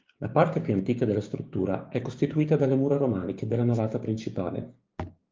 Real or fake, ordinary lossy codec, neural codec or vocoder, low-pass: fake; Opus, 32 kbps; codec, 16 kHz, 6 kbps, DAC; 7.2 kHz